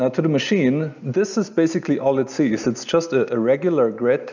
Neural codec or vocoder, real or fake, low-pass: none; real; 7.2 kHz